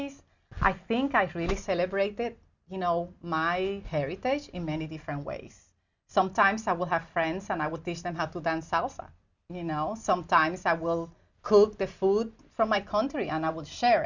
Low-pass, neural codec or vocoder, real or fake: 7.2 kHz; none; real